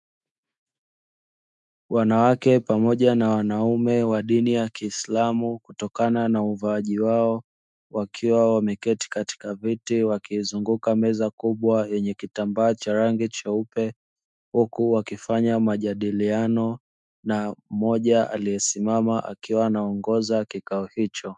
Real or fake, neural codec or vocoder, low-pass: fake; autoencoder, 48 kHz, 128 numbers a frame, DAC-VAE, trained on Japanese speech; 10.8 kHz